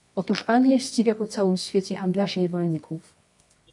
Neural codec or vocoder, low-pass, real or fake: codec, 24 kHz, 0.9 kbps, WavTokenizer, medium music audio release; 10.8 kHz; fake